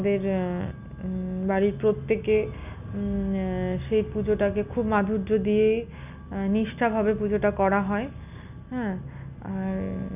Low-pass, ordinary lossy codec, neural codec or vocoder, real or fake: 3.6 kHz; none; none; real